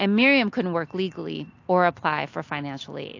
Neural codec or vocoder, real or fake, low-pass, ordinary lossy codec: none; real; 7.2 kHz; AAC, 48 kbps